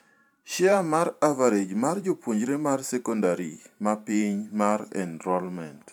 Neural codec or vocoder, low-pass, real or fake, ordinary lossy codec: vocoder, 44.1 kHz, 128 mel bands every 512 samples, BigVGAN v2; 19.8 kHz; fake; none